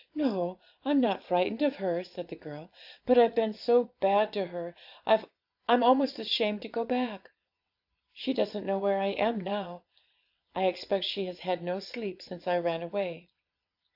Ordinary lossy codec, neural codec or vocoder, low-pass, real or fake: AAC, 48 kbps; vocoder, 22.05 kHz, 80 mel bands, Vocos; 5.4 kHz; fake